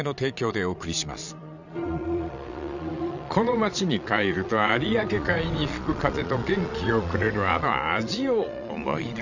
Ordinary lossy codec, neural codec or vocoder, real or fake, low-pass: none; vocoder, 22.05 kHz, 80 mel bands, Vocos; fake; 7.2 kHz